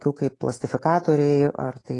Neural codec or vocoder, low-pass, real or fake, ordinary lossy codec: codec, 24 kHz, 3.1 kbps, DualCodec; 10.8 kHz; fake; AAC, 32 kbps